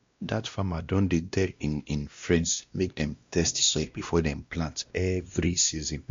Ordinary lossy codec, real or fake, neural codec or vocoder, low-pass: MP3, 64 kbps; fake; codec, 16 kHz, 1 kbps, X-Codec, WavLM features, trained on Multilingual LibriSpeech; 7.2 kHz